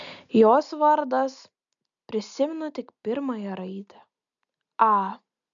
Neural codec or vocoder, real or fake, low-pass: none; real; 7.2 kHz